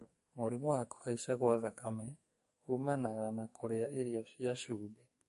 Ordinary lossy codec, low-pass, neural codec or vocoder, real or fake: MP3, 48 kbps; 14.4 kHz; codec, 44.1 kHz, 2.6 kbps, SNAC; fake